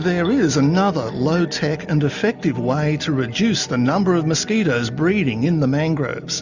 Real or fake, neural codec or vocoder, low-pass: real; none; 7.2 kHz